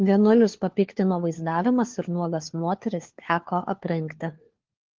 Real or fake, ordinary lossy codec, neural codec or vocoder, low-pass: fake; Opus, 16 kbps; codec, 16 kHz, 4 kbps, FunCodec, trained on LibriTTS, 50 frames a second; 7.2 kHz